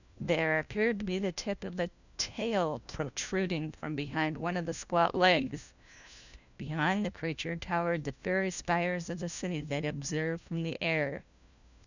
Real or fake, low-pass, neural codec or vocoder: fake; 7.2 kHz; codec, 16 kHz, 1 kbps, FunCodec, trained on LibriTTS, 50 frames a second